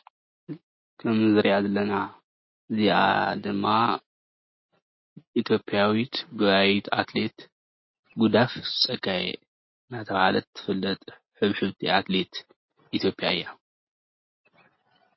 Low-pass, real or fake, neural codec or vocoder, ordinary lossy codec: 7.2 kHz; real; none; MP3, 24 kbps